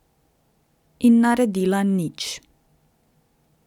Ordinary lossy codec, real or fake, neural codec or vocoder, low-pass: none; real; none; 19.8 kHz